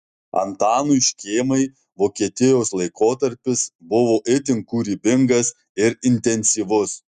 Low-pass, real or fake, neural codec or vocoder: 10.8 kHz; real; none